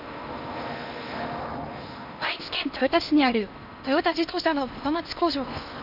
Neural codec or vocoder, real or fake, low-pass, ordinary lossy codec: codec, 16 kHz in and 24 kHz out, 0.8 kbps, FocalCodec, streaming, 65536 codes; fake; 5.4 kHz; none